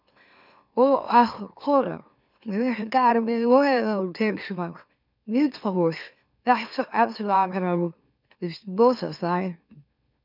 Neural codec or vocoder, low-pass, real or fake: autoencoder, 44.1 kHz, a latent of 192 numbers a frame, MeloTTS; 5.4 kHz; fake